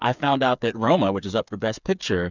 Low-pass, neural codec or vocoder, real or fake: 7.2 kHz; codec, 16 kHz, 8 kbps, FreqCodec, smaller model; fake